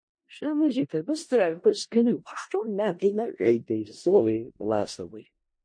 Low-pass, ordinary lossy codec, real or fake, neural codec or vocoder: 9.9 kHz; MP3, 48 kbps; fake; codec, 16 kHz in and 24 kHz out, 0.4 kbps, LongCat-Audio-Codec, four codebook decoder